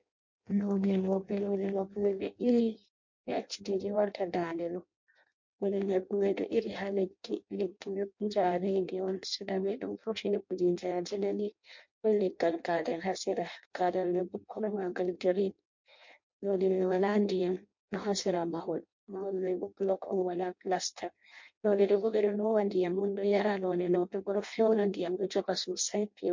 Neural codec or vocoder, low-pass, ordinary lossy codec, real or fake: codec, 16 kHz in and 24 kHz out, 0.6 kbps, FireRedTTS-2 codec; 7.2 kHz; MP3, 48 kbps; fake